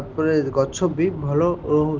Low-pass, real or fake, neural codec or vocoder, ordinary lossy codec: 7.2 kHz; real; none; Opus, 16 kbps